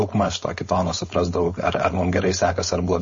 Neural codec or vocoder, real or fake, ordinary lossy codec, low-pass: codec, 16 kHz, 4.8 kbps, FACodec; fake; MP3, 32 kbps; 7.2 kHz